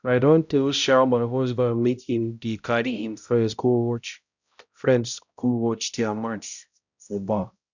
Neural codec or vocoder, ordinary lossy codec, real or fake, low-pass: codec, 16 kHz, 0.5 kbps, X-Codec, HuBERT features, trained on balanced general audio; none; fake; 7.2 kHz